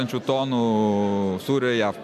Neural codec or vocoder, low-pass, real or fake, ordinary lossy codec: none; 14.4 kHz; real; MP3, 96 kbps